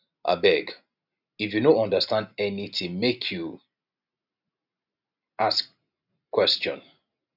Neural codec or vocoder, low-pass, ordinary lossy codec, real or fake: none; 5.4 kHz; none; real